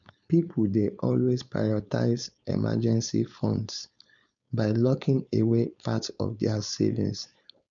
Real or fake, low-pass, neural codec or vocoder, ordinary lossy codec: fake; 7.2 kHz; codec, 16 kHz, 4.8 kbps, FACodec; none